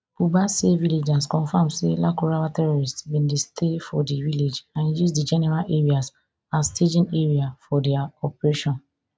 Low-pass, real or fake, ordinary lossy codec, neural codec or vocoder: none; real; none; none